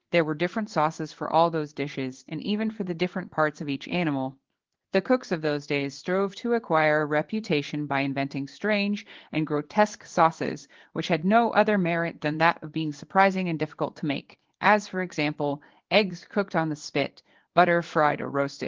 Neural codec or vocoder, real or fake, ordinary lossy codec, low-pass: codec, 16 kHz in and 24 kHz out, 1 kbps, XY-Tokenizer; fake; Opus, 16 kbps; 7.2 kHz